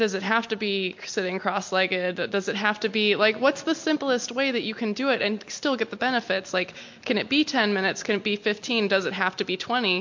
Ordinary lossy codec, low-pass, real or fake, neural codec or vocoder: MP3, 48 kbps; 7.2 kHz; real; none